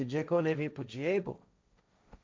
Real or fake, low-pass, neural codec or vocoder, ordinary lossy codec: fake; none; codec, 16 kHz, 1.1 kbps, Voila-Tokenizer; none